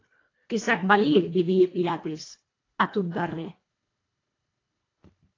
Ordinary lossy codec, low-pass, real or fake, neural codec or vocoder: AAC, 32 kbps; 7.2 kHz; fake; codec, 24 kHz, 1.5 kbps, HILCodec